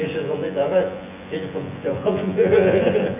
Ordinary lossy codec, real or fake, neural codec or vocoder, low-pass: none; fake; vocoder, 24 kHz, 100 mel bands, Vocos; 3.6 kHz